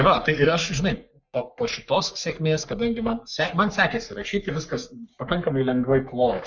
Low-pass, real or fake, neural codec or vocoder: 7.2 kHz; fake; codec, 44.1 kHz, 3.4 kbps, Pupu-Codec